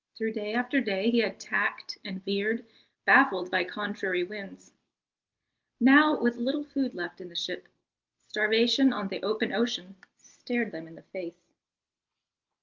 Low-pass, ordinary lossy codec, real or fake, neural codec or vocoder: 7.2 kHz; Opus, 32 kbps; real; none